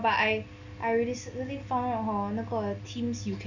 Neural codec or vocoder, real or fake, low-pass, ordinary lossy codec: none; real; 7.2 kHz; none